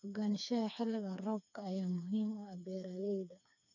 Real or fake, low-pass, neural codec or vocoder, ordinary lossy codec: fake; 7.2 kHz; codec, 16 kHz, 4 kbps, FreqCodec, smaller model; none